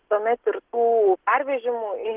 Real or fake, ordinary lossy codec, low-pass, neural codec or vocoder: real; Opus, 16 kbps; 3.6 kHz; none